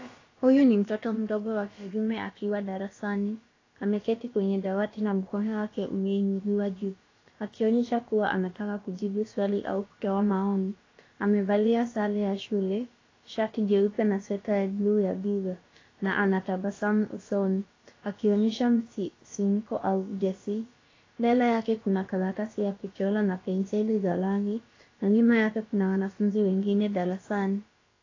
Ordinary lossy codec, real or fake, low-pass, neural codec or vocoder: AAC, 32 kbps; fake; 7.2 kHz; codec, 16 kHz, about 1 kbps, DyCAST, with the encoder's durations